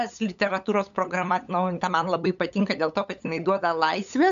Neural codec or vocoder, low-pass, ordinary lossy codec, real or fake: codec, 16 kHz, 8 kbps, FunCodec, trained on LibriTTS, 25 frames a second; 7.2 kHz; AAC, 96 kbps; fake